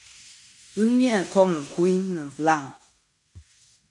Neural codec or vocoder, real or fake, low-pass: codec, 16 kHz in and 24 kHz out, 0.9 kbps, LongCat-Audio-Codec, fine tuned four codebook decoder; fake; 10.8 kHz